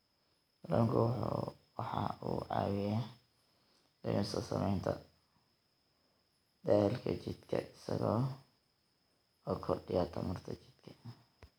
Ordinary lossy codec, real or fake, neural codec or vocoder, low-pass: none; real; none; none